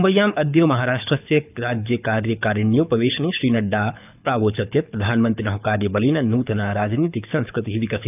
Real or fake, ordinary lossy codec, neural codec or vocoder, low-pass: fake; none; codec, 24 kHz, 6 kbps, HILCodec; 3.6 kHz